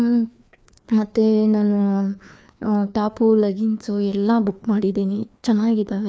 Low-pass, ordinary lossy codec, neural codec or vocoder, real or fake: none; none; codec, 16 kHz, 2 kbps, FreqCodec, larger model; fake